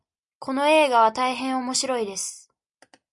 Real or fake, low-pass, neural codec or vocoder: real; 10.8 kHz; none